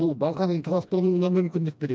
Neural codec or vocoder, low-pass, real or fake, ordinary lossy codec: codec, 16 kHz, 1 kbps, FreqCodec, smaller model; none; fake; none